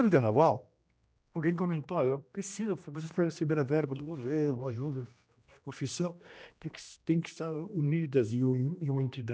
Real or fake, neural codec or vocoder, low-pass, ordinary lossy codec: fake; codec, 16 kHz, 1 kbps, X-Codec, HuBERT features, trained on general audio; none; none